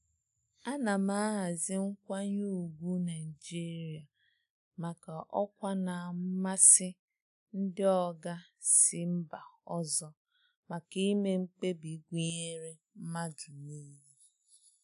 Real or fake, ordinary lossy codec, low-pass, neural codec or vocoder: real; none; 10.8 kHz; none